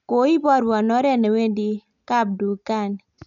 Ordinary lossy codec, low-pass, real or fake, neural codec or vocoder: none; 7.2 kHz; real; none